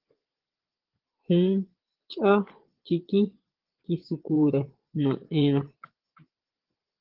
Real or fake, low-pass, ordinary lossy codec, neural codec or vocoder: fake; 5.4 kHz; Opus, 16 kbps; vocoder, 22.05 kHz, 80 mel bands, Vocos